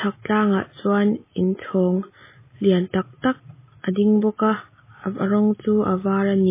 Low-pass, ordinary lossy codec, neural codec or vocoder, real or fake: 3.6 kHz; MP3, 16 kbps; none; real